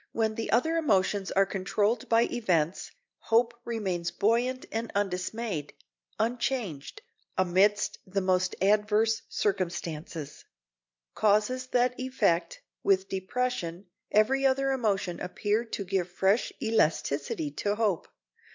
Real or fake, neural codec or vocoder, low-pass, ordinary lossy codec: real; none; 7.2 kHz; MP3, 64 kbps